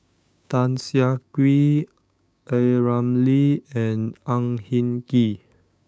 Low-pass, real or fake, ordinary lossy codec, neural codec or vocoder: none; fake; none; codec, 16 kHz, 6 kbps, DAC